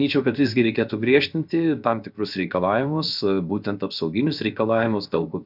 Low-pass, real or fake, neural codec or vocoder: 5.4 kHz; fake; codec, 16 kHz, 0.7 kbps, FocalCodec